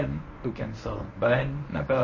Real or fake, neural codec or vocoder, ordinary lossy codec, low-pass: fake; codec, 24 kHz, 0.9 kbps, WavTokenizer, medium speech release version 1; MP3, 32 kbps; 7.2 kHz